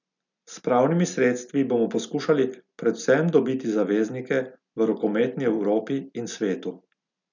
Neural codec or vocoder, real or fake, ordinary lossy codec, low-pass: none; real; none; 7.2 kHz